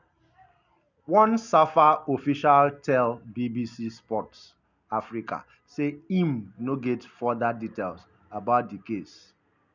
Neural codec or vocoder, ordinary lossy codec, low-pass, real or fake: none; none; 7.2 kHz; real